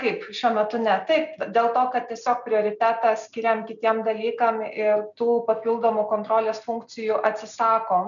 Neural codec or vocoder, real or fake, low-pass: none; real; 7.2 kHz